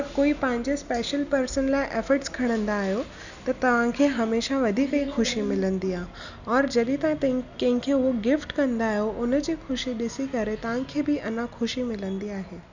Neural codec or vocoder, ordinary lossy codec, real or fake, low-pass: none; none; real; 7.2 kHz